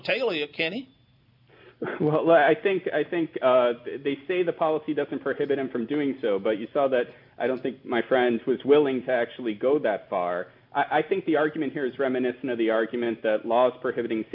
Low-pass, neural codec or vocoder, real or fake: 5.4 kHz; none; real